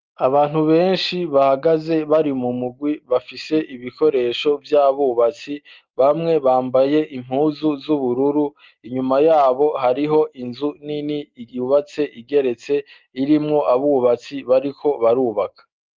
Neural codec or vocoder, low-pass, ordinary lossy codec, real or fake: none; 7.2 kHz; Opus, 32 kbps; real